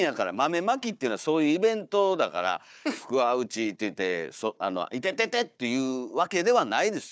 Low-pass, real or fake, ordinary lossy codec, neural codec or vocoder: none; fake; none; codec, 16 kHz, 4 kbps, FunCodec, trained on Chinese and English, 50 frames a second